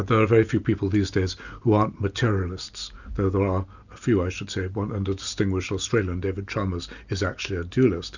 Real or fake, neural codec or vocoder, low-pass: real; none; 7.2 kHz